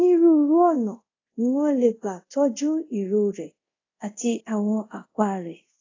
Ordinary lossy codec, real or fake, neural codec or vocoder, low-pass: AAC, 32 kbps; fake; codec, 24 kHz, 0.5 kbps, DualCodec; 7.2 kHz